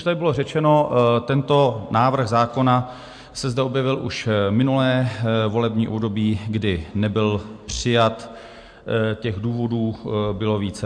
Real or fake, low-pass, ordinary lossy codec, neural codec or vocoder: real; 9.9 kHz; MP3, 64 kbps; none